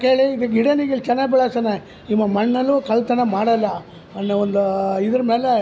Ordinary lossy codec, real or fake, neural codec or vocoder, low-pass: none; real; none; none